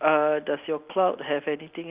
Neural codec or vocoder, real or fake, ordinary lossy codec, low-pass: none; real; Opus, 24 kbps; 3.6 kHz